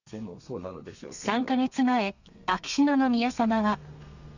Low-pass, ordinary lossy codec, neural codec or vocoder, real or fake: 7.2 kHz; none; codec, 32 kHz, 1.9 kbps, SNAC; fake